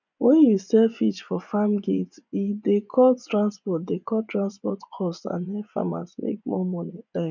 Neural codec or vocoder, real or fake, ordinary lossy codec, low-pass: vocoder, 24 kHz, 100 mel bands, Vocos; fake; none; 7.2 kHz